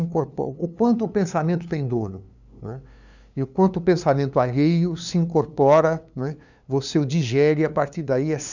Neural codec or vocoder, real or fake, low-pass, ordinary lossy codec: codec, 16 kHz, 2 kbps, FunCodec, trained on LibriTTS, 25 frames a second; fake; 7.2 kHz; none